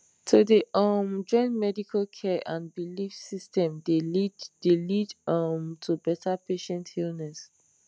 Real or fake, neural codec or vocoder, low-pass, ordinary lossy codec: real; none; none; none